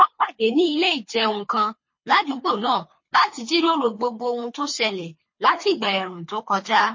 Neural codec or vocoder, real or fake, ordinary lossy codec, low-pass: codec, 24 kHz, 3 kbps, HILCodec; fake; MP3, 32 kbps; 7.2 kHz